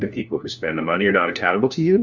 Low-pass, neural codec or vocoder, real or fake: 7.2 kHz; codec, 16 kHz, 1 kbps, FunCodec, trained on LibriTTS, 50 frames a second; fake